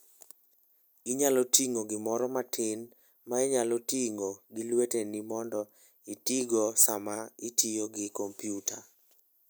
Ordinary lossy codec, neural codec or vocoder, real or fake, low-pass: none; none; real; none